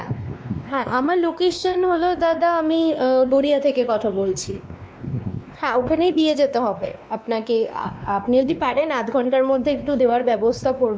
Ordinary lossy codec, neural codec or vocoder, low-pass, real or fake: none; codec, 16 kHz, 2 kbps, X-Codec, WavLM features, trained on Multilingual LibriSpeech; none; fake